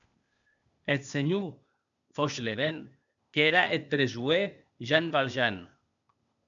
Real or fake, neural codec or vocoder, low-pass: fake; codec, 16 kHz, 0.8 kbps, ZipCodec; 7.2 kHz